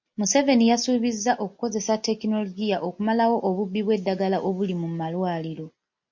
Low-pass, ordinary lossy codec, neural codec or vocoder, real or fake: 7.2 kHz; MP3, 48 kbps; none; real